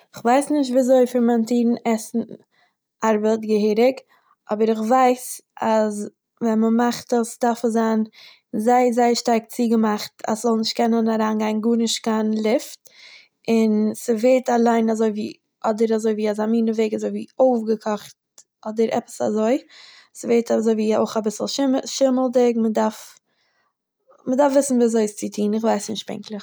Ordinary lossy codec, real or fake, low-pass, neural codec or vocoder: none; real; none; none